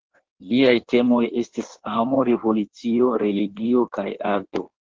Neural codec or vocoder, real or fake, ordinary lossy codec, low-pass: codec, 16 kHz in and 24 kHz out, 1.1 kbps, FireRedTTS-2 codec; fake; Opus, 16 kbps; 7.2 kHz